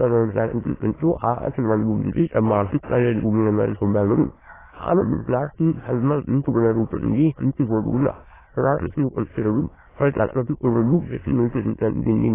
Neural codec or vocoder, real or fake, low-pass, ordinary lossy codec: autoencoder, 22.05 kHz, a latent of 192 numbers a frame, VITS, trained on many speakers; fake; 3.6 kHz; AAC, 16 kbps